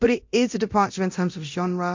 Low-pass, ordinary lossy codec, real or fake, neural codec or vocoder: 7.2 kHz; MP3, 48 kbps; fake; codec, 24 kHz, 0.9 kbps, DualCodec